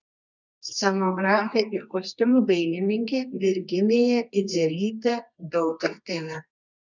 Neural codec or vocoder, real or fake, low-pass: codec, 24 kHz, 0.9 kbps, WavTokenizer, medium music audio release; fake; 7.2 kHz